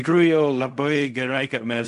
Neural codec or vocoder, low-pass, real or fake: codec, 16 kHz in and 24 kHz out, 0.4 kbps, LongCat-Audio-Codec, fine tuned four codebook decoder; 10.8 kHz; fake